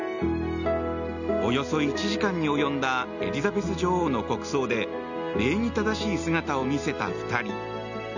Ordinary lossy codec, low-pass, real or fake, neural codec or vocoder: none; 7.2 kHz; real; none